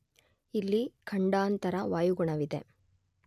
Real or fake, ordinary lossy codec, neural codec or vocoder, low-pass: real; none; none; 14.4 kHz